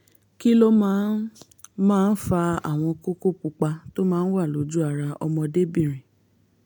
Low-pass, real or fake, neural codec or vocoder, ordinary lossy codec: 19.8 kHz; real; none; MP3, 96 kbps